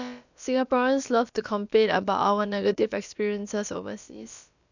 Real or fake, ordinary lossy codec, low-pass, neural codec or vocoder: fake; none; 7.2 kHz; codec, 16 kHz, about 1 kbps, DyCAST, with the encoder's durations